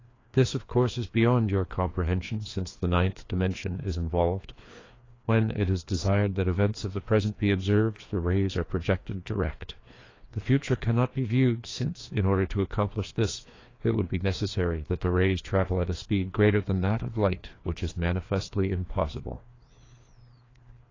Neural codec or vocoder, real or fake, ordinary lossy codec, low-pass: codec, 16 kHz, 2 kbps, FreqCodec, larger model; fake; AAC, 32 kbps; 7.2 kHz